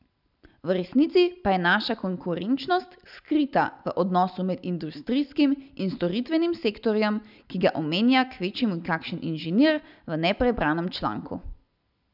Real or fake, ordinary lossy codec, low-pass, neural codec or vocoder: real; none; 5.4 kHz; none